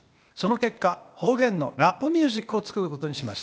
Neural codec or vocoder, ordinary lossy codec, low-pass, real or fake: codec, 16 kHz, 0.8 kbps, ZipCodec; none; none; fake